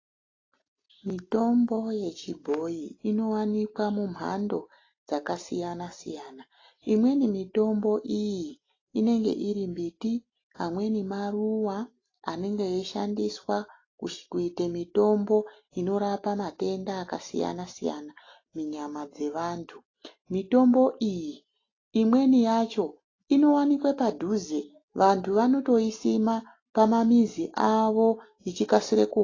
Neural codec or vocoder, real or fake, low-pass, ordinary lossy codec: none; real; 7.2 kHz; AAC, 32 kbps